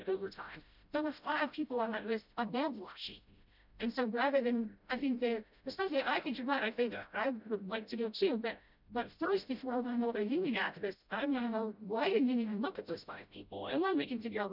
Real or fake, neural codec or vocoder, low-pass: fake; codec, 16 kHz, 0.5 kbps, FreqCodec, smaller model; 5.4 kHz